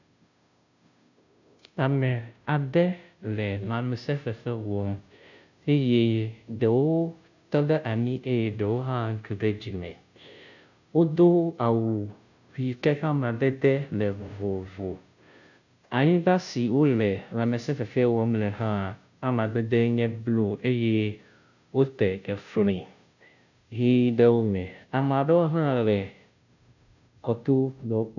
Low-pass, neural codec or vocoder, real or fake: 7.2 kHz; codec, 16 kHz, 0.5 kbps, FunCodec, trained on Chinese and English, 25 frames a second; fake